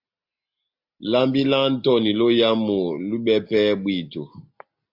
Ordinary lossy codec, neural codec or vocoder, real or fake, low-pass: MP3, 48 kbps; none; real; 5.4 kHz